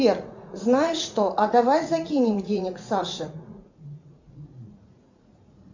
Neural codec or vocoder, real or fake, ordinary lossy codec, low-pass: vocoder, 44.1 kHz, 80 mel bands, Vocos; fake; MP3, 64 kbps; 7.2 kHz